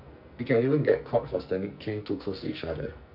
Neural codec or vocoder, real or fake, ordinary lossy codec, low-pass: codec, 44.1 kHz, 2.6 kbps, SNAC; fake; none; 5.4 kHz